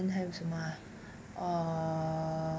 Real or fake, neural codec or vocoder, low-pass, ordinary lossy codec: real; none; none; none